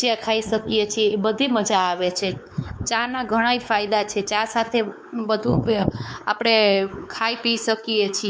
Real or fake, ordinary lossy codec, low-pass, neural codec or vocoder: fake; none; none; codec, 16 kHz, 4 kbps, X-Codec, WavLM features, trained on Multilingual LibriSpeech